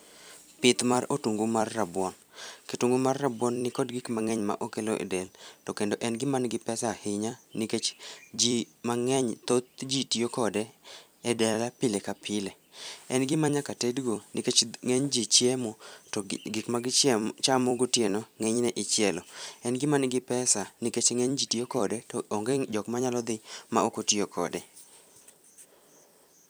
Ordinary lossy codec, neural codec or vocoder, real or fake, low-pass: none; vocoder, 44.1 kHz, 128 mel bands every 256 samples, BigVGAN v2; fake; none